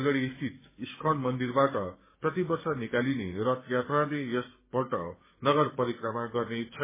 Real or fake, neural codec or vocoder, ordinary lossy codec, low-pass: fake; codec, 16 kHz, 6 kbps, DAC; MP3, 16 kbps; 3.6 kHz